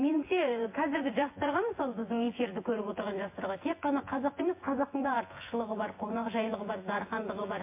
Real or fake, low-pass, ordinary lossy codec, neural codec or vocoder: fake; 3.6 kHz; MP3, 24 kbps; vocoder, 24 kHz, 100 mel bands, Vocos